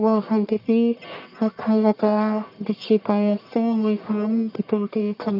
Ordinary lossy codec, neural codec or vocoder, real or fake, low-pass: none; codec, 44.1 kHz, 1.7 kbps, Pupu-Codec; fake; 5.4 kHz